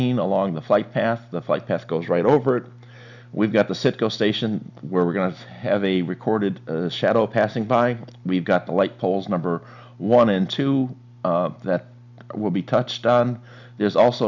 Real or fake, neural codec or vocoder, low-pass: real; none; 7.2 kHz